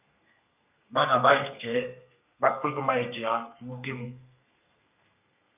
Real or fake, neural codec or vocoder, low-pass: fake; codec, 32 kHz, 1.9 kbps, SNAC; 3.6 kHz